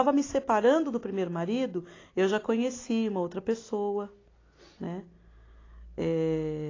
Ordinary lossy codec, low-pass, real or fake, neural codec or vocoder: AAC, 32 kbps; 7.2 kHz; real; none